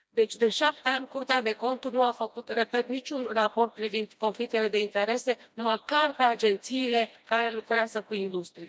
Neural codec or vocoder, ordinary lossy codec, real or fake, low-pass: codec, 16 kHz, 1 kbps, FreqCodec, smaller model; none; fake; none